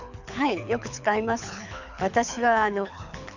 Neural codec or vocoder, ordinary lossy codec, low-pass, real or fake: codec, 24 kHz, 6 kbps, HILCodec; none; 7.2 kHz; fake